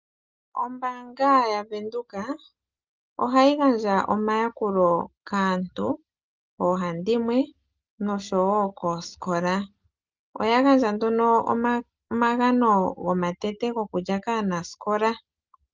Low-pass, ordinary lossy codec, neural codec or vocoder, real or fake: 7.2 kHz; Opus, 24 kbps; none; real